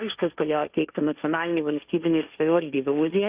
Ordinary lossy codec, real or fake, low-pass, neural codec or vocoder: Opus, 64 kbps; fake; 3.6 kHz; codec, 16 kHz, 1.1 kbps, Voila-Tokenizer